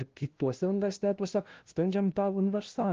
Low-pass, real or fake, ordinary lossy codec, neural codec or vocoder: 7.2 kHz; fake; Opus, 16 kbps; codec, 16 kHz, 0.5 kbps, FunCodec, trained on LibriTTS, 25 frames a second